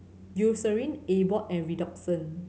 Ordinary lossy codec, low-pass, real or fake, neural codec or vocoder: none; none; real; none